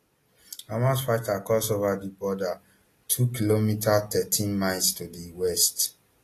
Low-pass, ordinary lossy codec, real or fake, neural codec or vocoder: 14.4 kHz; AAC, 48 kbps; real; none